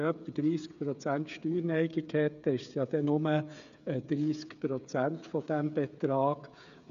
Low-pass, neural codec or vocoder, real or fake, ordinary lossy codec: 7.2 kHz; codec, 16 kHz, 8 kbps, FreqCodec, smaller model; fake; none